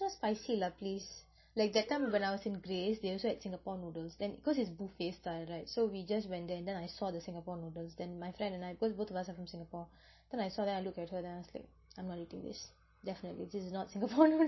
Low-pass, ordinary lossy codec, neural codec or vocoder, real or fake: 7.2 kHz; MP3, 24 kbps; none; real